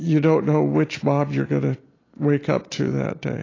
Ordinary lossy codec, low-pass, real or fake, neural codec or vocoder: AAC, 32 kbps; 7.2 kHz; real; none